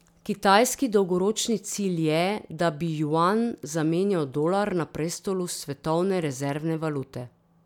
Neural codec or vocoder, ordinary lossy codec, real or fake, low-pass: none; none; real; 19.8 kHz